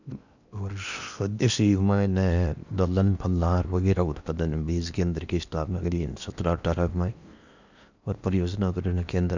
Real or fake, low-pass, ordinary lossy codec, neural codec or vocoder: fake; 7.2 kHz; none; codec, 16 kHz in and 24 kHz out, 0.8 kbps, FocalCodec, streaming, 65536 codes